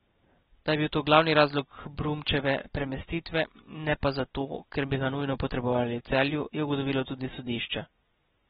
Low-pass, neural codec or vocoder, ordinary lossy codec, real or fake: 19.8 kHz; none; AAC, 16 kbps; real